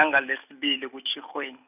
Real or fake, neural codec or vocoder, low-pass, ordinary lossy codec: real; none; 3.6 kHz; none